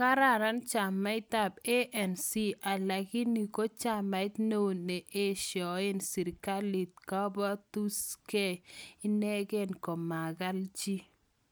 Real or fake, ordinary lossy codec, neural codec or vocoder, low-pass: real; none; none; none